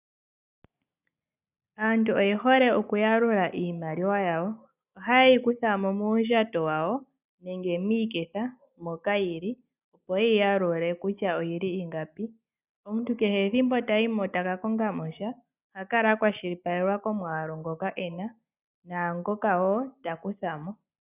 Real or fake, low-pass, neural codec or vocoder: real; 3.6 kHz; none